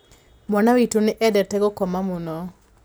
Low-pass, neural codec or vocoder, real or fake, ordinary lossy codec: none; none; real; none